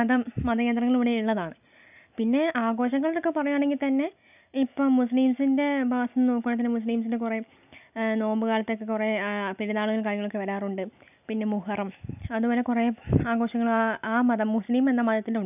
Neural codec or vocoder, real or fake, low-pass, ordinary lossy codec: none; real; 3.6 kHz; none